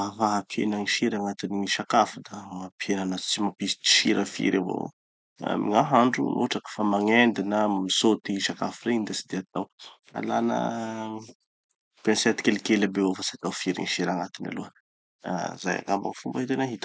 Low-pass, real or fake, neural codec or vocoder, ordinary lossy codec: none; real; none; none